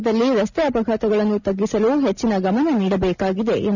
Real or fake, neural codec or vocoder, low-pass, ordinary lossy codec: real; none; 7.2 kHz; none